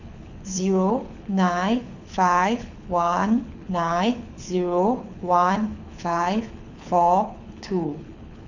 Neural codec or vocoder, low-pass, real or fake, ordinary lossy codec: codec, 24 kHz, 6 kbps, HILCodec; 7.2 kHz; fake; none